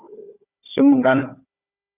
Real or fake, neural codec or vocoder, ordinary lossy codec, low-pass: fake; codec, 16 kHz, 4 kbps, FunCodec, trained on Chinese and English, 50 frames a second; Opus, 64 kbps; 3.6 kHz